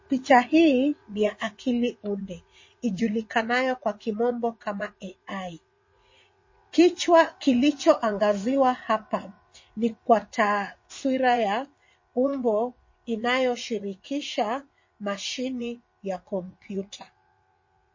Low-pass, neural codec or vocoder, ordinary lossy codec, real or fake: 7.2 kHz; vocoder, 44.1 kHz, 80 mel bands, Vocos; MP3, 32 kbps; fake